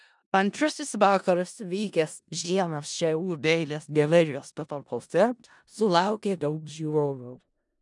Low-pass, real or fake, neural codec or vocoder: 10.8 kHz; fake; codec, 16 kHz in and 24 kHz out, 0.4 kbps, LongCat-Audio-Codec, four codebook decoder